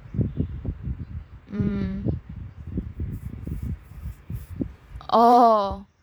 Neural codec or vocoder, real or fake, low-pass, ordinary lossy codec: vocoder, 44.1 kHz, 128 mel bands every 512 samples, BigVGAN v2; fake; none; none